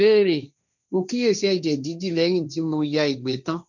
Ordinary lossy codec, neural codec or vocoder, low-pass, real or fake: none; codec, 16 kHz, 1.1 kbps, Voila-Tokenizer; 7.2 kHz; fake